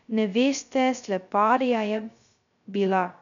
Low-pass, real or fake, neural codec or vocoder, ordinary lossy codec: 7.2 kHz; fake; codec, 16 kHz, 0.2 kbps, FocalCodec; none